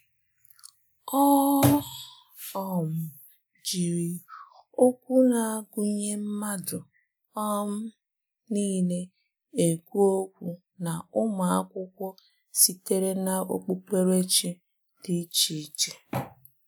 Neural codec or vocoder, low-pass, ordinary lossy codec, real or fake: none; none; none; real